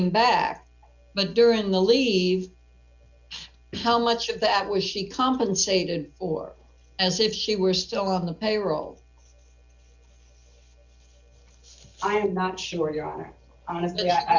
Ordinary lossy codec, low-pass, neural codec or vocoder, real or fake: Opus, 64 kbps; 7.2 kHz; none; real